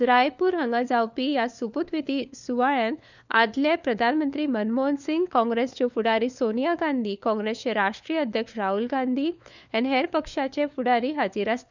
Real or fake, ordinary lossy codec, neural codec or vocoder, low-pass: fake; none; codec, 16 kHz, 4 kbps, FunCodec, trained on LibriTTS, 50 frames a second; 7.2 kHz